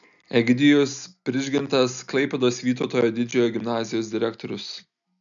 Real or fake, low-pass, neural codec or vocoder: real; 7.2 kHz; none